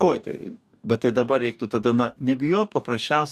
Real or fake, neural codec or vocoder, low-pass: fake; codec, 44.1 kHz, 2.6 kbps, DAC; 14.4 kHz